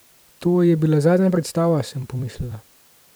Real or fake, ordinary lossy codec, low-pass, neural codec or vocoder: fake; none; none; vocoder, 44.1 kHz, 128 mel bands every 256 samples, BigVGAN v2